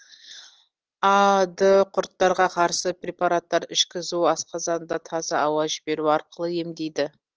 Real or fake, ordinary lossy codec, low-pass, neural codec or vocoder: real; Opus, 16 kbps; 7.2 kHz; none